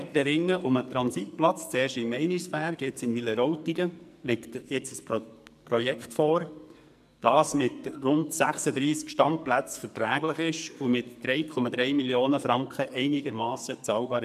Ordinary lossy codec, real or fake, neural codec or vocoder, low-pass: MP3, 96 kbps; fake; codec, 44.1 kHz, 2.6 kbps, SNAC; 14.4 kHz